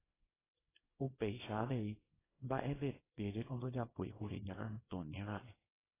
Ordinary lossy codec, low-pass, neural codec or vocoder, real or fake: AAC, 16 kbps; 3.6 kHz; codec, 24 kHz, 0.9 kbps, WavTokenizer, small release; fake